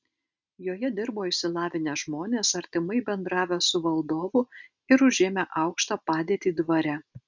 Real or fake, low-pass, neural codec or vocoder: real; 7.2 kHz; none